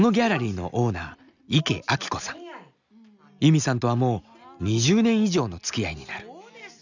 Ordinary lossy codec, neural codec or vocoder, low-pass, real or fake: none; none; 7.2 kHz; real